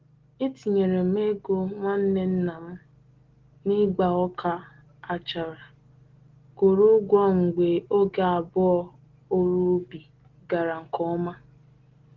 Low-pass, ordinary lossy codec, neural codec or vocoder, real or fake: 7.2 kHz; Opus, 16 kbps; none; real